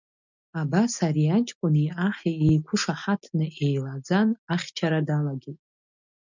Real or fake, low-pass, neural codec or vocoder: real; 7.2 kHz; none